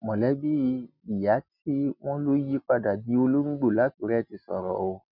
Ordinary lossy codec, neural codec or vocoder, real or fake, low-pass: none; none; real; 5.4 kHz